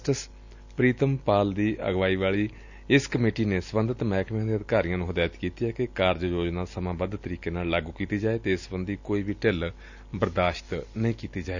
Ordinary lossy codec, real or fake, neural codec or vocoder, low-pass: none; real; none; 7.2 kHz